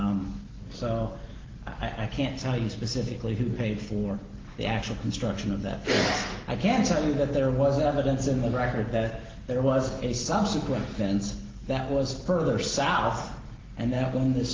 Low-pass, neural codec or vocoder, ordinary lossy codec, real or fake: 7.2 kHz; none; Opus, 16 kbps; real